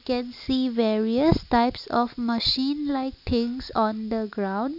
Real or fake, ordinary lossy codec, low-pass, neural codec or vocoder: real; none; 5.4 kHz; none